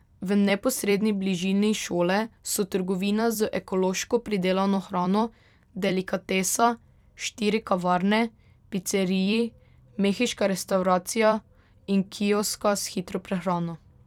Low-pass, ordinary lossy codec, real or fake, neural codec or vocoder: 19.8 kHz; none; fake; vocoder, 44.1 kHz, 128 mel bands every 256 samples, BigVGAN v2